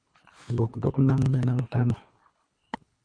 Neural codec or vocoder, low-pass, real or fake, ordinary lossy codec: codec, 24 kHz, 3 kbps, HILCodec; 9.9 kHz; fake; MP3, 48 kbps